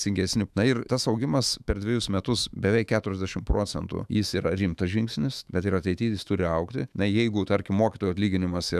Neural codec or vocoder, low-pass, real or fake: autoencoder, 48 kHz, 128 numbers a frame, DAC-VAE, trained on Japanese speech; 14.4 kHz; fake